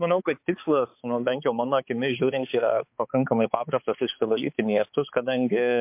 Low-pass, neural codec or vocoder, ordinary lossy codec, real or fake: 3.6 kHz; codec, 16 kHz, 4 kbps, X-Codec, HuBERT features, trained on balanced general audio; MP3, 32 kbps; fake